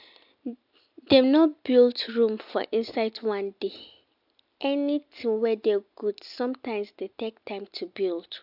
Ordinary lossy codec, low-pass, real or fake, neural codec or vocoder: AAC, 48 kbps; 5.4 kHz; real; none